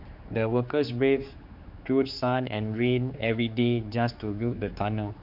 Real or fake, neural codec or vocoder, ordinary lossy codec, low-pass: fake; codec, 16 kHz, 4 kbps, X-Codec, HuBERT features, trained on general audio; MP3, 48 kbps; 5.4 kHz